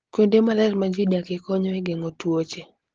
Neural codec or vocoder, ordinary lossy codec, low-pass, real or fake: none; Opus, 16 kbps; 7.2 kHz; real